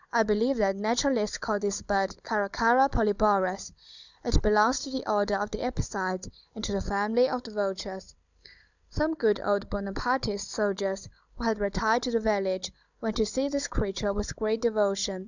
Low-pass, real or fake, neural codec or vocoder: 7.2 kHz; fake; codec, 16 kHz, 16 kbps, FunCodec, trained on Chinese and English, 50 frames a second